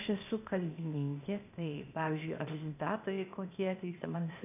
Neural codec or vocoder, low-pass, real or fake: codec, 16 kHz, 0.8 kbps, ZipCodec; 3.6 kHz; fake